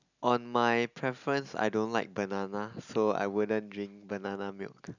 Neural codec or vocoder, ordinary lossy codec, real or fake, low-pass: none; none; real; 7.2 kHz